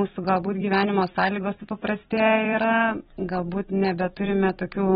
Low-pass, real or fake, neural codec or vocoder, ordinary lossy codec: 19.8 kHz; fake; vocoder, 44.1 kHz, 128 mel bands every 256 samples, BigVGAN v2; AAC, 16 kbps